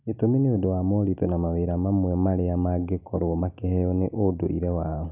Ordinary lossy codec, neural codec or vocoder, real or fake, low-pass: none; none; real; 3.6 kHz